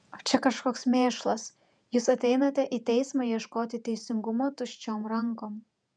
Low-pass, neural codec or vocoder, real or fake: 9.9 kHz; vocoder, 48 kHz, 128 mel bands, Vocos; fake